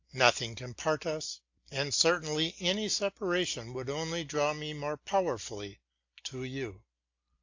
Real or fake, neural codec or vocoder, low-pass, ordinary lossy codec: real; none; 7.2 kHz; AAC, 48 kbps